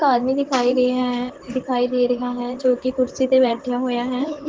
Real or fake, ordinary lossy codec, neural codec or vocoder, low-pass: fake; Opus, 16 kbps; vocoder, 44.1 kHz, 128 mel bands, Pupu-Vocoder; 7.2 kHz